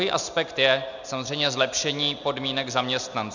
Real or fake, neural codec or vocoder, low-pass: real; none; 7.2 kHz